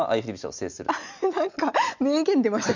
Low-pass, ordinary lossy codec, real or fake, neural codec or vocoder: 7.2 kHz; none; real; none